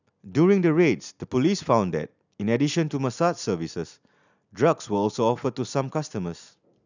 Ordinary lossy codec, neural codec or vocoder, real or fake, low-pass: none; none; real; 7.2 kHz